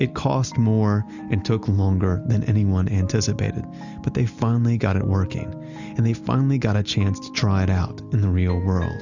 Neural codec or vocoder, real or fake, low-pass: none; real; 7.2 kHz